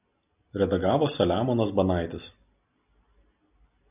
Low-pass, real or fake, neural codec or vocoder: 3.6 kHz; real; none